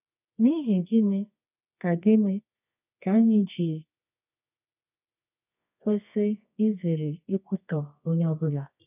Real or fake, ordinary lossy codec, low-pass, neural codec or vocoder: fake; none; 3.6 kHz; codec, 24 kHz, 0.9 kbps, WavTokenizer, medium music audio release